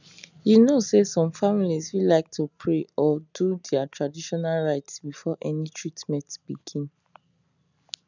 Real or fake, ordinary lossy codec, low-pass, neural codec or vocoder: real; none; 7.2 kHz; none